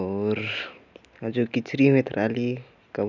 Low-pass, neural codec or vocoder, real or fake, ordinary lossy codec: 7.2 kHz; none; real; none